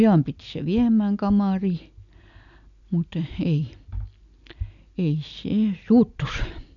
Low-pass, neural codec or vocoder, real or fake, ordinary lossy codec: 7.2 kHz; none; real; none